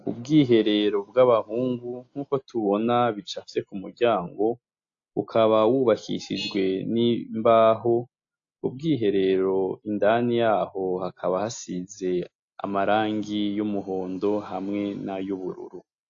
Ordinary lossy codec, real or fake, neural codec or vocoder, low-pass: AAC, 48 kbps; real; none; 7.2 kHz